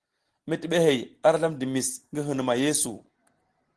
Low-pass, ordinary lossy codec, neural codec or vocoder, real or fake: 10.8 kHz; Opus, 16 kbps; none; real